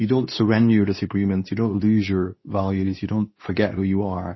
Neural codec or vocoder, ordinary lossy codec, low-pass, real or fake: codec, 24 kHz, 0.9 kbps, WavTokenizer, medium speech release version 2; MP3, 24 kbps; 7.2 kHz; fake